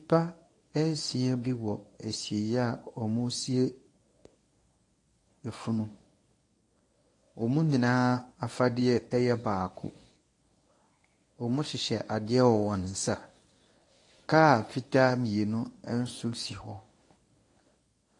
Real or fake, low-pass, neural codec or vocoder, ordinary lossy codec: fake; 10.8 kHz; codec, 24 kHz, 0.9 kbps, WavTokenizer, medium speech release version 1; AAC, 48 kbps